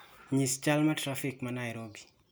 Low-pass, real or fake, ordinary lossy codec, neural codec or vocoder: none; real; none; none